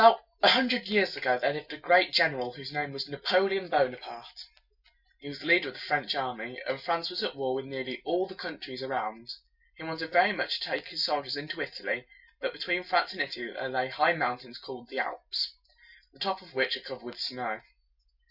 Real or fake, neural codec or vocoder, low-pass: real; none; 5.4 kHz